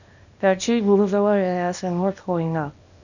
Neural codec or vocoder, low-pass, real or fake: codec, 24 kHz, 0.9 kbps, WavTokenizer, small release; 7.2 kHz; fake